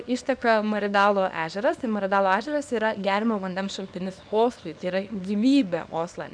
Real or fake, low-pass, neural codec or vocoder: fake; 9.9 kHz; codec, 24 kHz, 0.9 kbps, WavTokenizer, small release